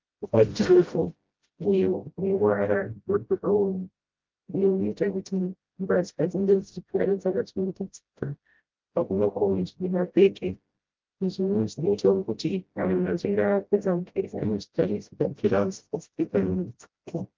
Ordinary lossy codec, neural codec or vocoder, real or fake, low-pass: Opus, 32 kbps; codec, 16 kHz, 0.5 kbps, FreqCodec, smaller model; fake; 7.2 kHz